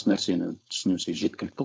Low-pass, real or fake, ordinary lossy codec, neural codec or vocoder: none; fake; none; codec, 16 kHz, 4.8 kbps, FACodec